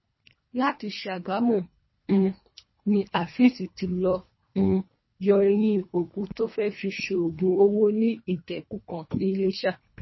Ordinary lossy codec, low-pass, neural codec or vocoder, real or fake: MP3, 24 kbps; 7.2 kHz; codec, 24 kHz, 1.5 kbps, HILCodec; fake